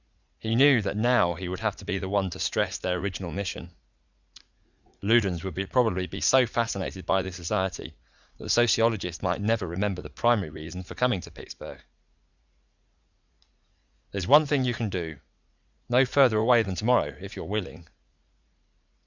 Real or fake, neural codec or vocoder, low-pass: fake; vocoder, 44.1 kHz, 80 mel bands, Vocos; 7.2 kHz